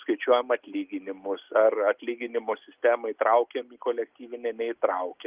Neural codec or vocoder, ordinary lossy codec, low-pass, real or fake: none; Opus, 32 kbps; 3.6 kHz; real